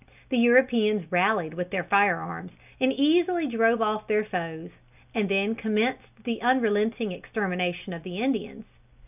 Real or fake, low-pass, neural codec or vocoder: real; 3.6 kHz; none